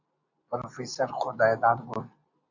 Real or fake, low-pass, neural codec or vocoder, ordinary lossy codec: real; 7.2 kHz; none; AAC, 48 kbps